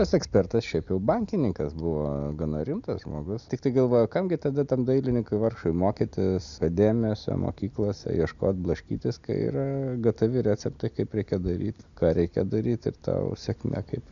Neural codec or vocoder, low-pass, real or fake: none; 7.2 kHz; real